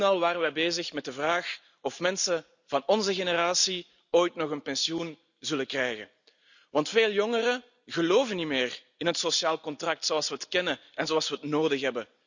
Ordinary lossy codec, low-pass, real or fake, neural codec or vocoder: none; 7.2 kHz; real; none